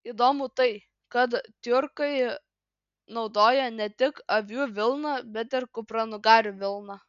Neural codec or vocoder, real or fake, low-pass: none; real; 7.2 kHz